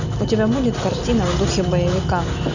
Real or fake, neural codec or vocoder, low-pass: real; none; 7.2 kHz